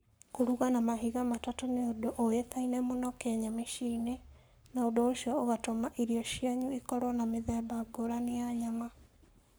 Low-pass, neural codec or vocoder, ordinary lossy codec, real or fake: none; codec, 44.1 kHz, 7.8 kbps, Pupu-Codec; none; fake